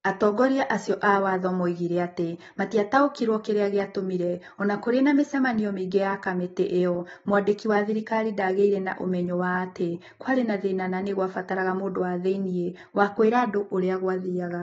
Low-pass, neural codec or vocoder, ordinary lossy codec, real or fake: 19.8 kHz; none; AAC, 24 kbps; real